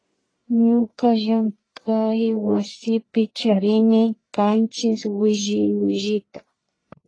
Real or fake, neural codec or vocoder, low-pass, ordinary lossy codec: fake; codec, 44.1 kHz, 1.7 kbps, Pupu-Codec; 9.9 kHz; AAC, 32 kbps